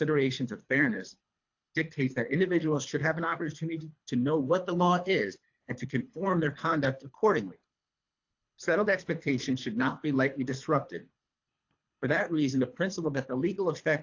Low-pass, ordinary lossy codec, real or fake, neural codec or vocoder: 7.2 kHz; AAC, 48 kbps; fake; codec, 24 kHz, 3 kbps, HILCodec